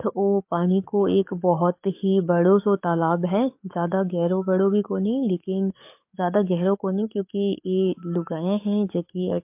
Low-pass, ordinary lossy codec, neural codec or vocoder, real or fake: 3.6 kHz; MP3, 32 kbps; codec, 44.1 kHz, 7.8 kbps, DAC; fake